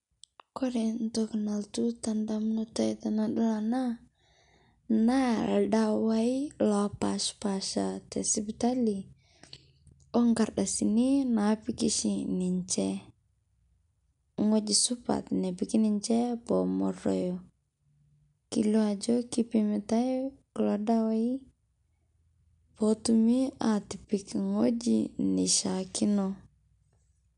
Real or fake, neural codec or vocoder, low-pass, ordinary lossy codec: real; none; 10.8 kHz; none